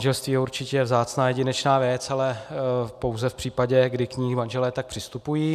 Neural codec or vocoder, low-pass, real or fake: none; 14.4 kHz; real